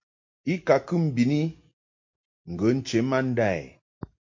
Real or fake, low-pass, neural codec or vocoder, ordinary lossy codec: real; 7.2 kHz; none; MP3, 48 kbps